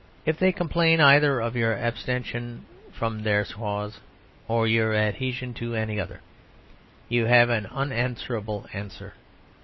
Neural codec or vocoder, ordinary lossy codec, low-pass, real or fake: none; MP3, 24 kbps; 7.2 kHz; real